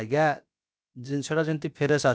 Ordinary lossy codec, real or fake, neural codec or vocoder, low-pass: none; fake; codec, 16 kHz, about 1 kbps, DyCAST, with the encoder's durations; none